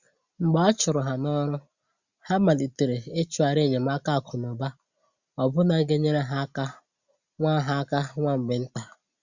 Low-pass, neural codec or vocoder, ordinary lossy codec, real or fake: 7.2 kHz; none; Opus, 64 kbps; real